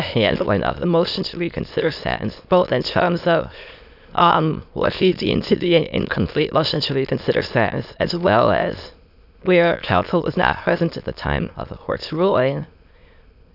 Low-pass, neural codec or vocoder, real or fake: 5.4 kHz; autoencoder, 22.05 kHz, a latent of 192 numbers a frame, VITS, trained on many speakers; fake